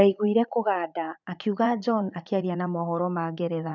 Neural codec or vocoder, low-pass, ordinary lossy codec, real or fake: vocoder, 44.1 kHz, 80 mel bands, Vocos; 7.2 kHz; none; fake